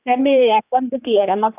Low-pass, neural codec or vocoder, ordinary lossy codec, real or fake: 3.6 kHz; codec, 16 kHz, 2 kbps, X-Codec, HuBERT features, trained on general audio; none; fake